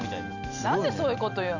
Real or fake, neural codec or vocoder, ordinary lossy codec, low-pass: real; none; none; 7.2 kHz